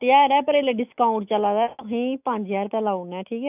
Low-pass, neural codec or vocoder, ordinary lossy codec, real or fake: 3.6 kHz; autoencoder, 48 kHz, 128 numbers a frame, DAC-VAE, trained on Japanese speech; none; fake